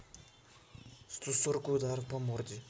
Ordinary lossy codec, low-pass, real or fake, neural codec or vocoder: none; none; real; none